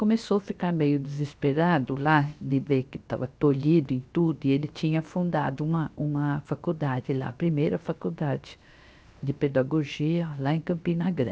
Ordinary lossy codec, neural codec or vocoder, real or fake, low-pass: none; codec, 16 kHz, 0.7 kbps, FocalCodec; fake; none